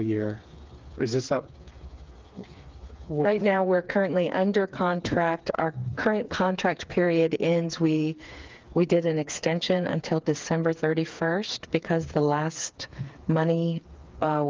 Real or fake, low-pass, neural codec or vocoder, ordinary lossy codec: fake; 7.2 kHz; codec, 16 kHz, 4 kbps, FreqCodec, smaller model; Opus, 32 kbps